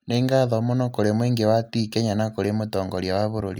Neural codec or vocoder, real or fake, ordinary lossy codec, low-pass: none; real; none; none